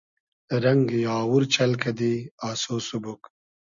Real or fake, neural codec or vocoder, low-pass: real; none; 7.2 kHz